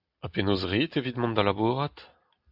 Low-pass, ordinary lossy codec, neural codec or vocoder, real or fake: 5.4 kHz; AAC, 48 kbps; none; real